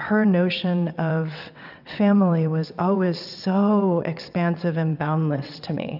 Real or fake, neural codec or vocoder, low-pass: fake; vocoder, 22.05 kHz, 80 mel bands, WaveNeXt; 5.4 kHz